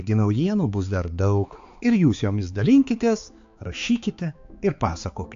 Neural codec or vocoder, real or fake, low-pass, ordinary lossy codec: codec, 16 kHz, 4 kbps, X-Codec, HuBERT features, trained on balanced general audio; fake; 7.2 kHz; AAC, 64 kbps